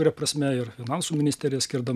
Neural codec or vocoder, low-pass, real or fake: none; 14.4 kHz; real